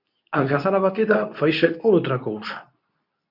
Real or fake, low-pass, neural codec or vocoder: fake; 5.4 kHz; codec, 24 kHz, 0.9 kbps, WavTokenizer, medium speech release version 2